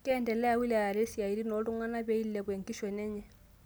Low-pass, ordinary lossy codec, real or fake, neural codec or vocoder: none; none; real; none